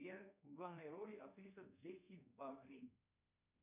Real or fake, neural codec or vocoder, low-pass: fake; codec, 24 kHz, 1.2 kbps, DualCodec; 3.6 kHz